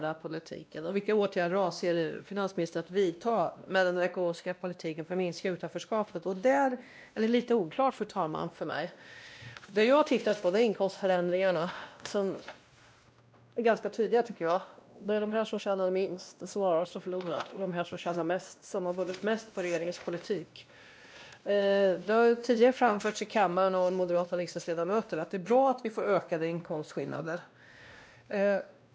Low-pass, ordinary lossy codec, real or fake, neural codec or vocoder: none; none; fake; codec, 16 kHz, 1 kbps, X-Codec, WavLM features, trained on Multilingual LibriSpeech